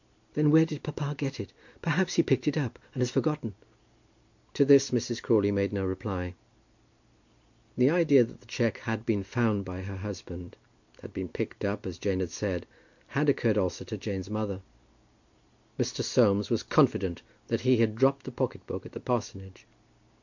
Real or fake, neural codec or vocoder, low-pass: real; none; 7.2 kHz